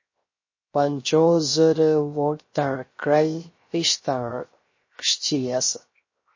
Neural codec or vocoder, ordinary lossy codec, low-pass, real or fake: codec, 16 kHz, 0.3 kbps, FocalCodec; MP3, 32 kbps; 7.2 kHz; fake